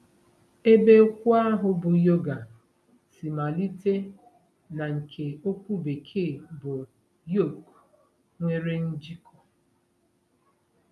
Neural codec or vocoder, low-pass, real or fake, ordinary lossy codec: none; none; real; none